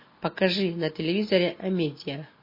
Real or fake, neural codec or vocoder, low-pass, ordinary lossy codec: real; none; 5.4 kHz; MP3, 24 kbps